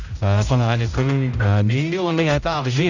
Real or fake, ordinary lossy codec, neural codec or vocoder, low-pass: fake; none; codec, 16 kHz, 0.5 kbps, X-Codec, HuBERT features, trained on general audio; 7.2 kHz